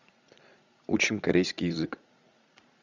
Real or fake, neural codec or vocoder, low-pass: fake; vocoder, 44.1 kHz, 80 mel bands, Vocos; 7.2 kHz